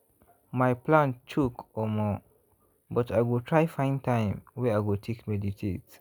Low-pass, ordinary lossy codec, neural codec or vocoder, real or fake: none; none; none; real